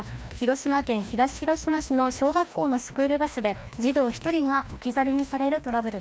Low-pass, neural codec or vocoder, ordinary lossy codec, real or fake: none; codec, 16 kHz, 1 kbps, FreqCodec, larger model; none; fake